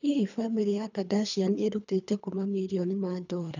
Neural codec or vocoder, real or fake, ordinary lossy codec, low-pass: codec, 24 kHz, 3 kbps, HILCodec; fake; none; 7.2 kHz